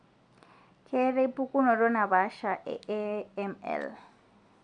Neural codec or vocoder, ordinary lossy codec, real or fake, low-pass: none; none; real; 9.9 kHz